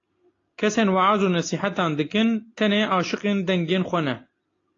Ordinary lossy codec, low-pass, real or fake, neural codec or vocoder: AAC, 32 kbps; 7.2 kHz; real; none